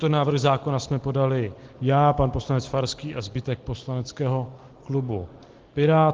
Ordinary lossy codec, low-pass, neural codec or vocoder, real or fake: Opus, 16 kbps; 7.2 kHz; none; real